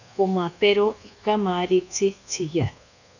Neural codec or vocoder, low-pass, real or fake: codec, 24 kHz, 1.2 kbps, DualCodec; 7.2 kHz; fake